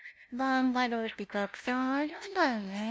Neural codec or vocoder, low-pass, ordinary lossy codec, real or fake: codec, 16 kHz, 0.5 kbps, FunCodec, trained on LibriTTS, 25 frames a second; none; none; fake